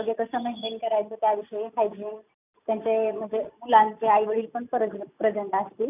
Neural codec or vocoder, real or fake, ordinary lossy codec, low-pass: none; real; none; 3.6 kHz